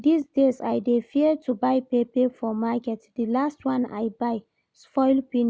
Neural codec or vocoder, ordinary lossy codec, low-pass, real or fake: none; none; none; real